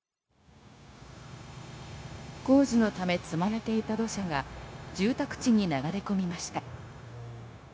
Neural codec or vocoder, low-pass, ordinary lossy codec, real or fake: codec, 16 kHz, 0.9 kbps, LongCat-Audio-Codec; none; none; fake